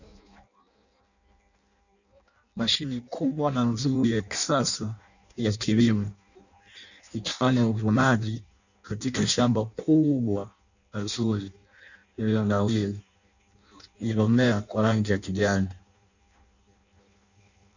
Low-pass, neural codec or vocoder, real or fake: 7.2 kHz; codec, 16 kHz in and 24 kHz out, 0.6 kbps, FireRedTTS-2 codec; fake